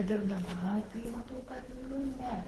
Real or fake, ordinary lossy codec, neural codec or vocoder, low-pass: fake; Opus, 16 kbps; codec, 24 kHz, 3 kbps, HILCodec; 10.8 kHz